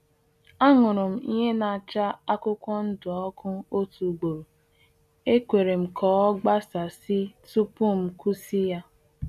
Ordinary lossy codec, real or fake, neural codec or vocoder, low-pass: none; real; none; 14.4 kHz